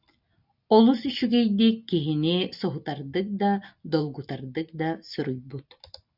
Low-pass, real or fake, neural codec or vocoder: 5.4 kHz; real; none